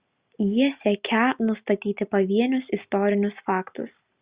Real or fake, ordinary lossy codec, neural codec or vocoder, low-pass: real; Opus, 64 kbps; none; 3.6 kHz